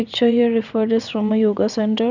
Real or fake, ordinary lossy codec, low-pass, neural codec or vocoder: fake; none; 7.2 kHz; vocoder, 22.05 kHz, 80 mel bands, WaveNeXt